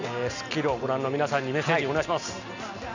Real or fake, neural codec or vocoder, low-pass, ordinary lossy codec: real; none; 7.2 kHz; none